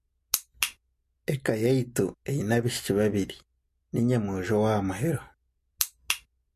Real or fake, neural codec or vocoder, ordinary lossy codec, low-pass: real; none; MP3, 64 kbps; 14.4 kHz